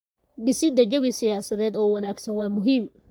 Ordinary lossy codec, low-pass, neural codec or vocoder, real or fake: none; none; codec, 44.1 kHz, 3.4 kbps, Pupu-Codec; fake